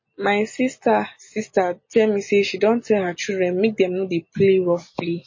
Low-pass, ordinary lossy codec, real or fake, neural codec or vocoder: 7.2 kHz; MP3, 32 kbps; real; none